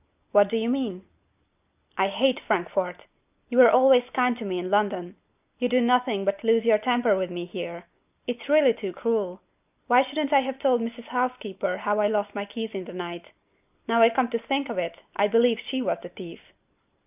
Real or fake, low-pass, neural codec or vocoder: real; 3.6 kHz; none